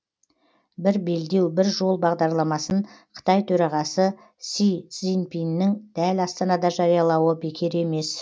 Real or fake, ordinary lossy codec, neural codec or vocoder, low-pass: real; none; none; none